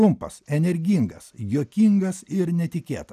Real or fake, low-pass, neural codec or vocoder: real; 14.4 kHz; none